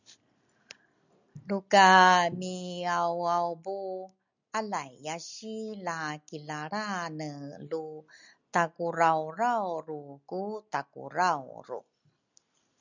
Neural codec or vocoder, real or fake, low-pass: none; real; 7.2 kHz